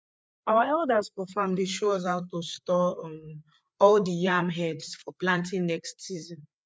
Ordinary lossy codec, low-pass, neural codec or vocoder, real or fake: none; none; codec, 16 kHz, 4 kbps, FreqCodec, larger model; fake